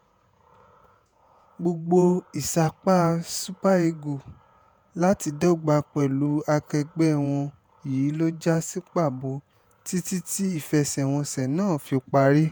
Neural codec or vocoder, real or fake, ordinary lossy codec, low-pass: vocoder, 48 kHz, 128 mel bands, Vocos; fake; none; none